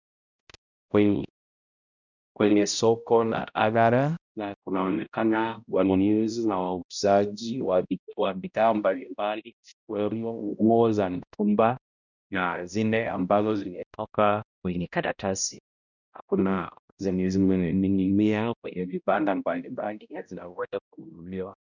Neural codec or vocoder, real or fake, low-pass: codec, 16 kHz, 0.5 kbps, X-Codec, HuBERT features, trained on balanced general audio; fake; 7.2 kHz